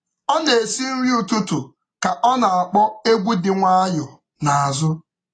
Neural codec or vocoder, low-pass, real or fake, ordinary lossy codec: none; 9.9 kHz; real; AAC, 32 kbps